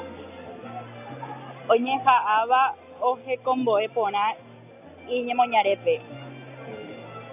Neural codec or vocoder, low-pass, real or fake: none; 3.6 kHz; real